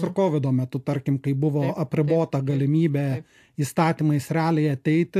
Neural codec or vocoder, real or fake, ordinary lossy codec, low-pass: none; real; AAC, 64 kbps; 14.4 kHz